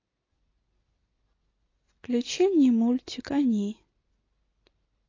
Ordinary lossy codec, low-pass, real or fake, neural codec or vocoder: AAC, 32 kbps; 7.2 kHz; real; none